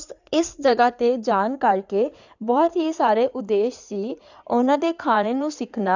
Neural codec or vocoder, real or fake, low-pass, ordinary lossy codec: codec, 16 kHz in and 24 kHz out, 2.2 kbps, FireRedTTS-2 codec; fake; 7.2 kHz; none